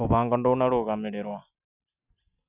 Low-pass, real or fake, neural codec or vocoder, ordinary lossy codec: 3.6 kHz; real; none; none